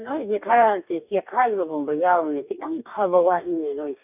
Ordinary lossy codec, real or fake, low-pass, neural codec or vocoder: none; fake; 3.6 kHz; codec, 44.1 kHz, 2.6 kbps, DAC